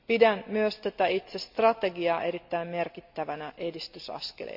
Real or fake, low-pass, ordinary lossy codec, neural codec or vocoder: real; 5.4 kHz; MP3, 48 kbps; none